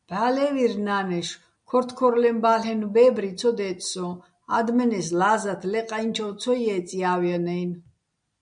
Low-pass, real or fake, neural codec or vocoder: 9.9 kHz; real; none